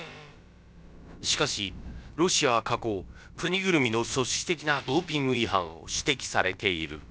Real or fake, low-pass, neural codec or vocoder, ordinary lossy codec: fake; none; codec, 16 kHz, about 1 kbps, DyCAST, with the encoder's durations; none